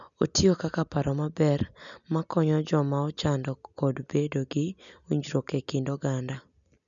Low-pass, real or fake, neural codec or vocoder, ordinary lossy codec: 7.2 kHz; real; none; none